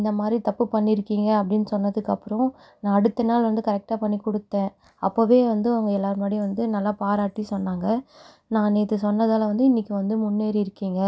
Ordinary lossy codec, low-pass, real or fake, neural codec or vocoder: none; none; real; none